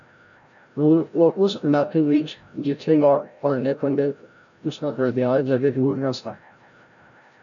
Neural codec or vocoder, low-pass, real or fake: codec, 16 kHz, 0.5 kbps, FreqCodec, larger model; 7.2 kHz; fake